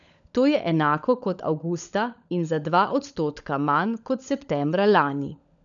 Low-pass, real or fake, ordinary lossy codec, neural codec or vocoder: 7.2 kHz; fake; none; codec, 16 kHz, 16 kbps, FunCodec, trained on LibriTTS, 50 frames a second